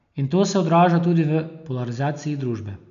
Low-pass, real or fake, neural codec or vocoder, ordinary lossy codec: 7.2 kHz; real; none; none